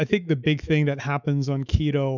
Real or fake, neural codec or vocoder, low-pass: fake; autoencoder, 48 kHz, 128 numbers a frame, DAC-VAE, trained on Japanese speech; 7.2 kHz